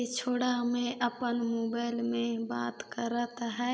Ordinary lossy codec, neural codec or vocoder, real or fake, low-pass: none; none; real; none